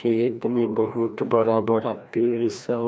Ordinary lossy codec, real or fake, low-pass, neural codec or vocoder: none; fake; none; codec, 16 kHz, 1 kbps, FreqCodec, larger model